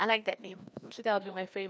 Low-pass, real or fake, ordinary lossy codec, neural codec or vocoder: none; fake; none; codec, 16 kHz, 2 kbps, FreqCodec, larger model